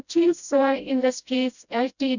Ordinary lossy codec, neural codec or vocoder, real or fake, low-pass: none; codec, 16 kHz, 0.5 kbps, FreqCodec, smaller model; fake; 7.2 kHz